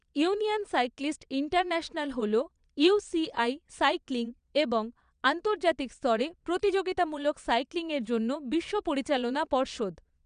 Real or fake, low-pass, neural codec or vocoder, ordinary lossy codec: fake; 9.9 kHz; vocoder, 22.05 kHz, 80 mel bands, Vocos; none